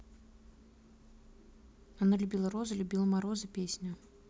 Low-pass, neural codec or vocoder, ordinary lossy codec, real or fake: none; none; none; real